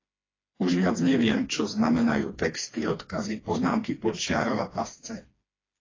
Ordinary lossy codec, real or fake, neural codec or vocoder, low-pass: AAC, 32 kbps; fake; codec, 16 kHz, 2 kbps, FreqCodec, smaller model; 7.2 kHz